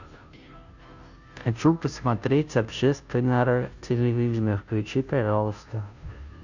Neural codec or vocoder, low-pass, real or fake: codec, 16 kHz, 0.5 kbps, FunCodec, trained on Chinese and English, 25 frames a second; 7.2 kHz; fake